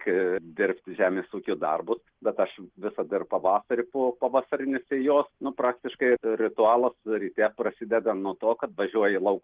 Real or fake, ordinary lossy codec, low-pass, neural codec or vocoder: real; Opus, 16 kbps; 3.6 kHz; none